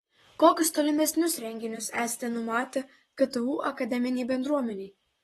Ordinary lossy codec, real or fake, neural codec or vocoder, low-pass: AAC, 32 kbps; fake; vocoder, 44.1 kHz, 128 mel bands, Pupu-Vocoder; 19.8 kHz